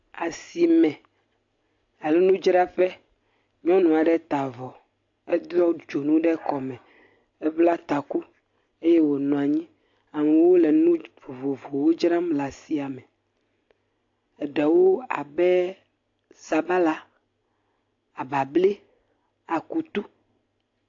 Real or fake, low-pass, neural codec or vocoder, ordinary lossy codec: real; 7.2 kHz; none; AAC, 48 kbps